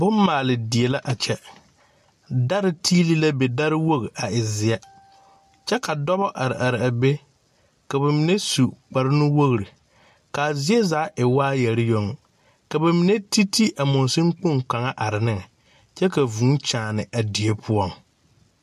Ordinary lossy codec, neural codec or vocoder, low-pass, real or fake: AAC, 96 kbps; none; 14.4 kHz; real